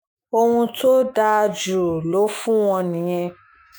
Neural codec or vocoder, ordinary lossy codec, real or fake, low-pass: autoencoder, 48 kHz, 128 numbers a frame, DAC-VAE, trained on Japanese speech; none; fake; none